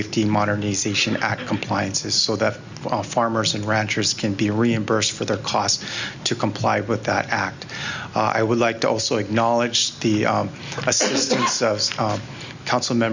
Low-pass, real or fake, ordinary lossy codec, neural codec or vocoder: 7.2 kHz; real; Opus, 64 kbps; none